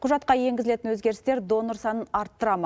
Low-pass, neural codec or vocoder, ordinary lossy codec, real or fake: none; none; none; real